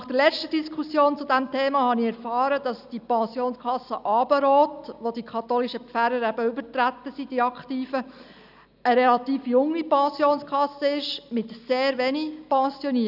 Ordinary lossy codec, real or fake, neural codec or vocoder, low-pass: none; real; none; 5.4 kHz